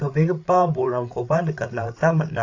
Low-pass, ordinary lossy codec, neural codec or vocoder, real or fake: 7.2 kHz; AAC, 48 kbps; codec, 16 kHz, 8 kbps, FreqCodec, larger model; fake